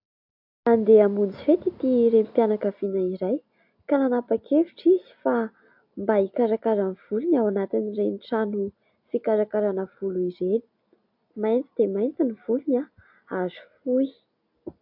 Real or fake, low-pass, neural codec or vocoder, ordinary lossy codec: real; 5.4 kHz; none; AAC, 48 kbps